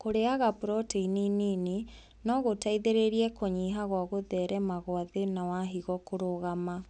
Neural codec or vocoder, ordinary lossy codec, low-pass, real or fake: none; none; 10.8 kHz; real